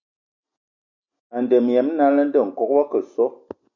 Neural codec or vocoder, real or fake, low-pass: none; real; 7.2 kHz